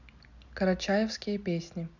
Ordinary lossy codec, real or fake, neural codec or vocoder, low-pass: none; real; none; 7.2 kHz